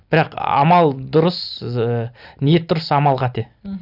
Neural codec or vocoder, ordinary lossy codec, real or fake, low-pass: none; none; real; 5.4 kHz